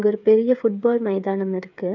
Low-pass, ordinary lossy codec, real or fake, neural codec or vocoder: 7.2 kHz; none; fake; codec, 16 kHz, 8 kbps, FreqCodec, smaller model